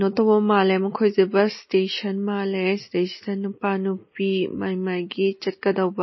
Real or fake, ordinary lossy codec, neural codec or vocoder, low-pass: real; MP3, 24 kbps; none; 7.2 kHz